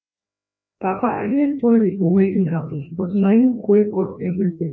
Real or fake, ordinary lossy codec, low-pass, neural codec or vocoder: fake; none; none; codec, 16 kHz, 1 kbps, FreqCodec, larger model